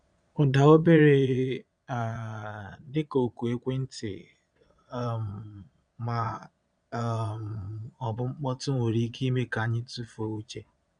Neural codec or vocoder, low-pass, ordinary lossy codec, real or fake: vocoder, 22.05 kHz, 80 mel bands, Vocos; 9.9 kHz; none; fake